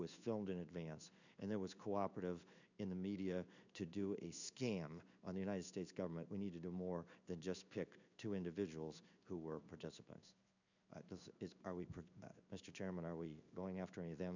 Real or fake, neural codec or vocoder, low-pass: fake; codec, 16 kHz in and 24 kHz out, 1 kbps, XY-Tokenizer; 7.2 kHz